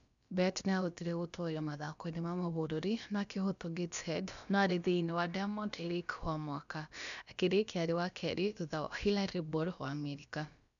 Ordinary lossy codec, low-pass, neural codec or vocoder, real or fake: none; 7.2 kHz; codec, 16 kHz, about 1 kbps, DyCAST, with the encoder's durations; fake